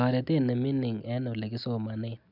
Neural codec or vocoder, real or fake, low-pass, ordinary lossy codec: none; real; 5.4 kHz; none